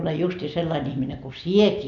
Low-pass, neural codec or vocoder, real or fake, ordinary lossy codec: 7.2 kHz; none; real; none